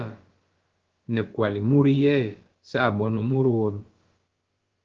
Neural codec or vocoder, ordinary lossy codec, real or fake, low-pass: codec, 16 kHz, about 1 kbps, DyCAST, with the encoder's durations; Opus, 16 kbps; fake; 7.2 kHz